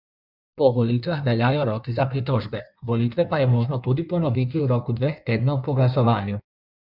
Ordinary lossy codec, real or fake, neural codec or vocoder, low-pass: Opus, 64 kbps; fake; codec, 16 kHz in and 24 kHz out, 1.1 kbps, FireRedTTS-2 codec; 5.4 kHz